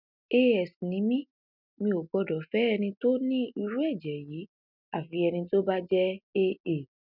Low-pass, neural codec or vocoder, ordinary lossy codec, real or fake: 5.4 kHz; none; none; real